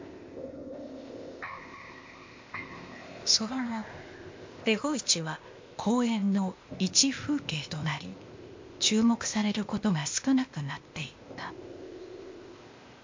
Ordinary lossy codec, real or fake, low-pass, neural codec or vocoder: MP3, 48 kbps; fake; 7.2 kHz; codec, 16 kHz, 0.8 kbps, ZipCodec